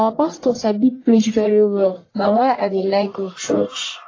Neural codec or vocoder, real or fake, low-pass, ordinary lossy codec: codec, 44.1 kHz, 1.7 kbps, Pupu-Codec; fake; 7.2 kHz; AAC, 32 kbps